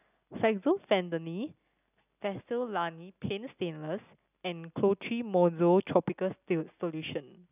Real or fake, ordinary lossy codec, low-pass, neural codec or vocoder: real; none; 3.6 kHz; none